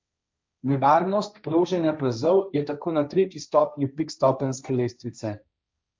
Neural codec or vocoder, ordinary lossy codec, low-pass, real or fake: codec, 16 kHz, 1.1 kbps, Voila-Tokenizer; none; none; fake